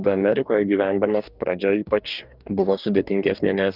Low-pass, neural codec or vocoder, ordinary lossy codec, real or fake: 5.4 kHz; codec, 44.1 kHz, 2.6 kbps, DAC; Opus, 24 kbps; fake